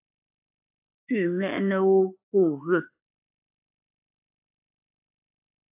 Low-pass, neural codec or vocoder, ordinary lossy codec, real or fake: 3.6 kHz; autoencoder, 48 kHz, 32 numbers a frame, DAC-VAE, trained on Japanese speech; AAC, 32 kbps; fake